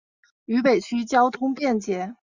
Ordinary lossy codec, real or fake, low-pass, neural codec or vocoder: Opus, 64 kbps; real; 7.2 kHz; none